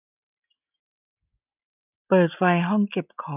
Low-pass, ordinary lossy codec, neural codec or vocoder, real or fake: 3.6 kHz; none; none; real